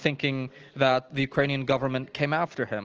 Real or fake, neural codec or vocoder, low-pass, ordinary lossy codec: real; none; 7.2 kHz; Opus, 16 kbps